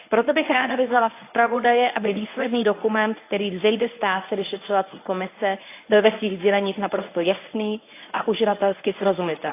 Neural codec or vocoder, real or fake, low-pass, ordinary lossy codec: codec, 24 kHz, 0.9 kbps, WavTokenizer, medium speech release version 1; fake; 3.6 kHz; AAC, 24 kbps